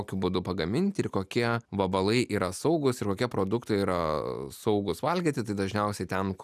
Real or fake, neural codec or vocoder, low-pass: real; none; 14.4 kHz